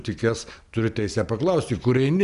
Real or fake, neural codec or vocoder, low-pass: real; none; 10.8 kHz